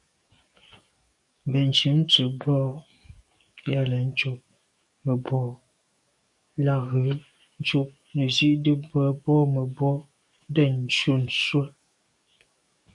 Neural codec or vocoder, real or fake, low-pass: codec, 44.1 kHz, 7.8 kbps, DAC; fake; 10.8 kHz